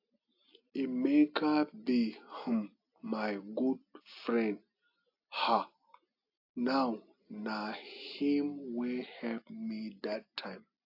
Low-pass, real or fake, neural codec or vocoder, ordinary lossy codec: 5.4 kHz; real; none; AAC, 48 kbps